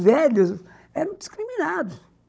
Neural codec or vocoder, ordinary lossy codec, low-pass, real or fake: codec, 16 kHz, 16 kbps, FunCodec, trained on Chinese and English, 50 frames a second; none; none; fake